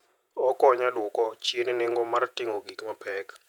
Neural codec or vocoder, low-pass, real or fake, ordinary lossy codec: vocoder, 48 kHz, 128 mel bands, Vocos; 19.8 kHz; fake; none